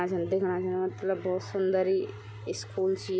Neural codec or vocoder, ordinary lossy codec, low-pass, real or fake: none; none; none; real